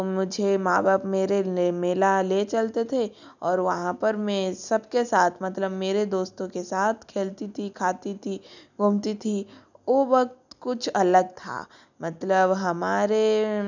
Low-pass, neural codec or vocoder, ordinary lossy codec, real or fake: 7.2 kHz; none; none; real